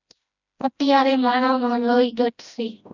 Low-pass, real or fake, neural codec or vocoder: 7.2 kHz; fake; codec, 16 kHz, 1 kbps, FreqCodec, smaller model